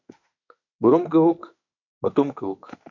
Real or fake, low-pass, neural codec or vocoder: fake; 7.2 kHz; autoencoder, 48 kHz, 32 numbers a frame, DAC-VAE, trained on Japanese speech